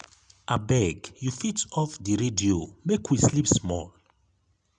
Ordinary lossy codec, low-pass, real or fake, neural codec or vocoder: none; 9.9 kHz; real; none